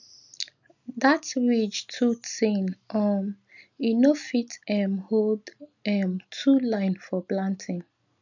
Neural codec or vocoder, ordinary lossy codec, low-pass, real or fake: none; none; 7.2 kHz; real